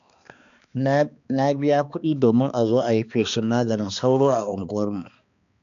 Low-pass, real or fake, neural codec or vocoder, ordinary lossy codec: 7.2 kHz; fake; codec, 16 kHz, 2 kbps, X-Codec, HuBERT features, trained on balanced general audio; MP3, 96 kbps